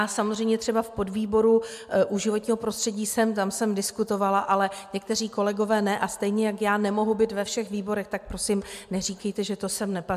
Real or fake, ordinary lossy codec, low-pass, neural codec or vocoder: real; MP3, 96 kbps; 14.4 kHz; none